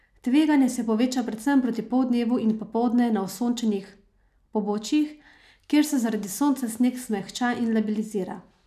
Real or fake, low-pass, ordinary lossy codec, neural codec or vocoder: real; 14.4 kHz; none; none